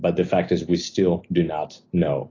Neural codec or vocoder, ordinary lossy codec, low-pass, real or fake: none; AAC, 32 kbps; 7.2 kHz; real